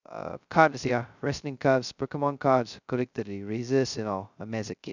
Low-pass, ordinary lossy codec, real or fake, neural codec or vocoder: 7.2 kHz; none; fake; codec, 16 kHz, 0.2 kbps, FocalCodec